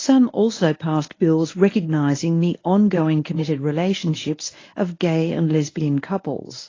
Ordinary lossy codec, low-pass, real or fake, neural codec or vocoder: AAC, 32 kbps; 7.2 kHz; fake; codec, 24 kHz, 0.9 kbps, WavTokenizer, medium speech release version 1